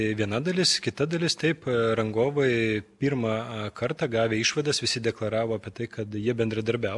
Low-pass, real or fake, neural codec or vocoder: 10.8 kHz; real; none